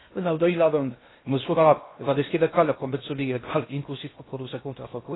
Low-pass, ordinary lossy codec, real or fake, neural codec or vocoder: 7.2 kHz; AAC, 16 kbps; fake; codec, 16 kHz in and 24 kHz out, 0.6 kbps, FocalCodec, streaming, 2048 codes